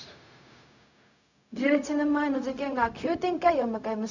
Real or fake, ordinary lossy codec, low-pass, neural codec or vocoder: fake; none; 7.2 kHz; codec, 16 kHz, 0.4 kbps, LongCat-Audio-Codec